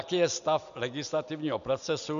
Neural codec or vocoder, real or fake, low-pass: none; real; 7.2 kHz